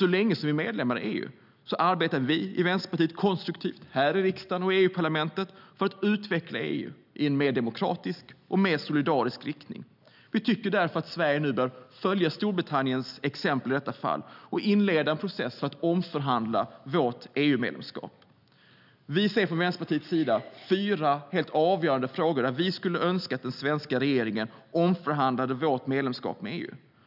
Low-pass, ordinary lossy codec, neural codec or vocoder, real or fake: 5.4 kHz; none; none; real